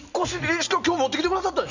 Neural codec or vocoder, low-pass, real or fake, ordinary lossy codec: none; 7.2 kHz; real; none